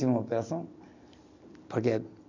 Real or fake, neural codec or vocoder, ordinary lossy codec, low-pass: real; none; none; 7.2 kHz